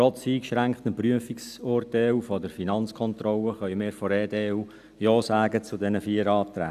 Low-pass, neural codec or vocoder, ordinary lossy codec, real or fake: 14.4 kHz; none; none; real